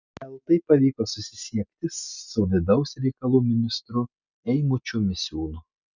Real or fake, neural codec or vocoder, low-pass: real; none; 7.2 kHz